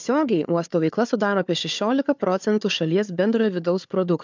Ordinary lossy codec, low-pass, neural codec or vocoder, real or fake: MP3, 64 kbps; 7.2 kHz; codec, 16 kHz, 4 kbps, FunCodec, trained on Chinese and English, 50 frames a second; fake